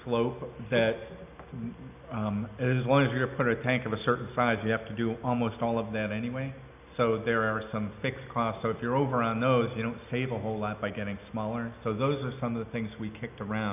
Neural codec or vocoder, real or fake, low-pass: none; real; 3.6 kHz